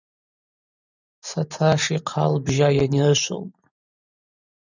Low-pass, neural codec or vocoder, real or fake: 7.2 kHz; vocoder, 44.1 kHz, 128 mel bands every 512 samples, BigVGAN v2; fake